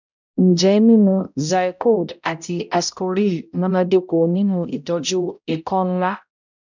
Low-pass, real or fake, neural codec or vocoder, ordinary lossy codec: 7.2 kHz; fake; codec, 16 kHz, 0.5 kbps, X-Codec, HuBERT features, trained on balanced general audio; none